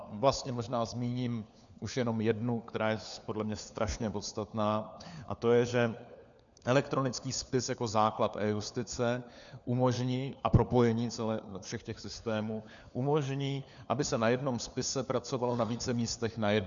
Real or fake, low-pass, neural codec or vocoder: fake; 7.2 kHz; codec, 16 kHz, 4 kbps, FunCodec, trained on LibriTTS, 50 frames a second